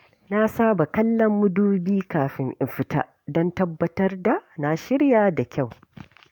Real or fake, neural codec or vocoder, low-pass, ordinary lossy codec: fake; codec, 44.1 kHz, 7.8 kbps, DAC; 19.8 kHz; MP3, 96 kbps